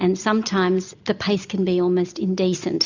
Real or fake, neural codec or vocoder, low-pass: real; none; 7.2 kHz